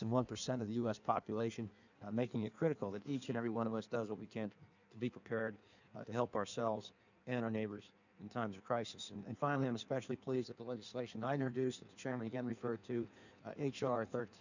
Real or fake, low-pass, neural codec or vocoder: fake; 7.2 kHz; codec, 16 kHz in and 24 kHz out, 1.1 kbps, FireRedTTS-2 codec